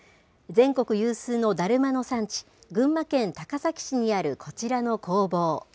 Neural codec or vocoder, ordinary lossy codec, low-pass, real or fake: none; none; none; real